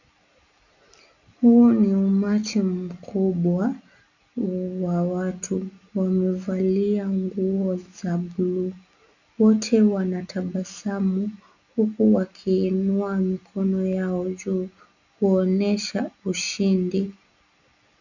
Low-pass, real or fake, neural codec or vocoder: 7.2 kHz; real; none